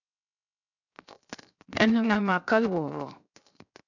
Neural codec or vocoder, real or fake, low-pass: codec, 16 kHz, 0.7 kbps, FocalCodec; fake; 7.2 kHz